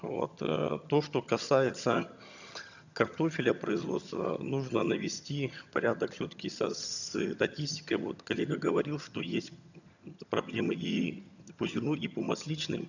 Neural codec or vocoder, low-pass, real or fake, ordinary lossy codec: vocoder, 22.05 kHz, 80 mel bands, HiFi-GAN; 7.2 kHz; fake; none